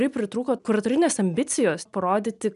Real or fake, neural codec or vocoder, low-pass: real; none; 10.8 kHz